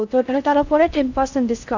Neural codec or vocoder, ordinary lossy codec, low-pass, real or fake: codec, 16 kHz in and 24 kHz out, 0.6 kbps, FocalCodec, streaming, 4096 codes; none; 7.2 kHz; fake